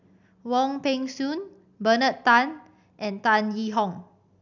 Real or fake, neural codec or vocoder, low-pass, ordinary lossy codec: real; none; 7.2 kHz; none